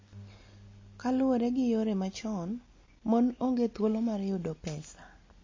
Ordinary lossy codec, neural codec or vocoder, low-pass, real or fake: MP3, 32 kbps; none; 7.2 kHz; real